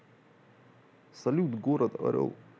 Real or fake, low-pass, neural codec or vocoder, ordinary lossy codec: real; none; none; none